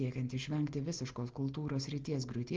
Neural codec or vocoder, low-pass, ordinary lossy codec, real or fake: none; 7.2 kHz; Opus, 16 kbps; real